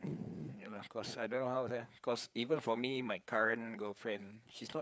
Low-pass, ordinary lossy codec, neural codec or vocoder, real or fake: none; none; codec, 16 kHz, 4 kbps, FreqCodec, larger model; fake